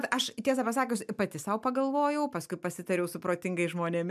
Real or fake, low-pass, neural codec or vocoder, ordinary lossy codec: real; 14.4 kHz; none; MP3, 96 kbps